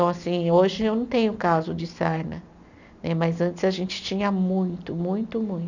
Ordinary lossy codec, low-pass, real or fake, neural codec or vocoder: none; 7.2 kHz; real; none